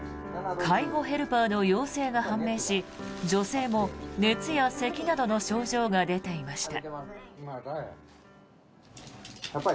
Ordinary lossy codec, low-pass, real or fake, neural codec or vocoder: none; none; real; none